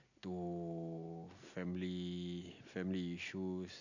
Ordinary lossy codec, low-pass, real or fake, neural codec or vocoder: none; 7.2 kHz; real; none